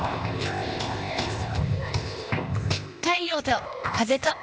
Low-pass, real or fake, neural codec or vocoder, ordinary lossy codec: none; fake; codec, 16 kHz, 0.8 kbps, ZipCodec; none